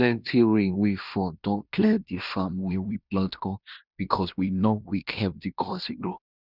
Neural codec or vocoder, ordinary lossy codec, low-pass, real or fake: codec, 16 kHz in and 24 kHz out, 0.9 kbps, LongCat-Audio-Codec, fine tuned four codebook decoder; none; 5.4 kHz; fake